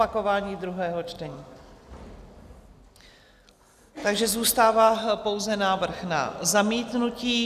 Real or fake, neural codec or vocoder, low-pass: real; none; 14.4 kHz